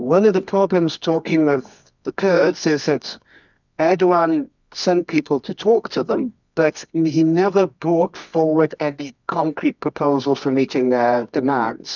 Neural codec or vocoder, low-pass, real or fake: codec, 24 kHz, 0.9 kbps, WavTokenizer, medium music audio release; 7.2 kHz; fake